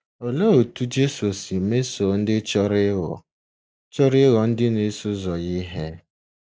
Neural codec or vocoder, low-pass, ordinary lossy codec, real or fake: none; none; none; real